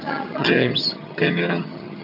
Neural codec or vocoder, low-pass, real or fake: vocoder, 22.05 kHz, 80 mel bands, HiFi-GAN; 5.4 kHz; fake